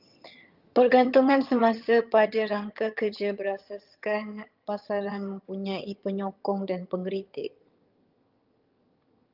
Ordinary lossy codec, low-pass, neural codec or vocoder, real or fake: Opus, 24 kbps; 5.4 kHz; vocoder, 22.05 kHz, 80 mel bands, HiFi-GAN; fake